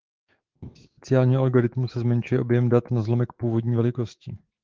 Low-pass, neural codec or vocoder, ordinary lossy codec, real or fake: 7.2 kHz; autoencoder, 48 kHz, 128 numbers a frame, DAC-VAE, trained on Japanese speech; Opus, 16 kbps; fake